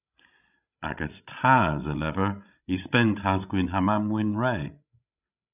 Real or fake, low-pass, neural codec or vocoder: fake; 3.6 kHz; codec, 16 kHz, 16 kbps, FreqCodec, larger model